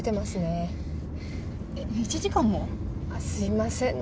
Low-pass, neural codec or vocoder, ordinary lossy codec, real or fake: none; none; none; real